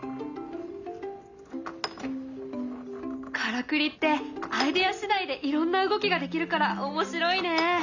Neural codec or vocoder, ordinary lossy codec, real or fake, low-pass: none; none; real; 7.2 kHz